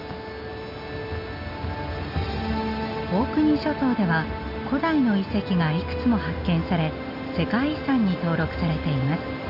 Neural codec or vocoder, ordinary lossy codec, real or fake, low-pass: none; none; real; 5.4 kHz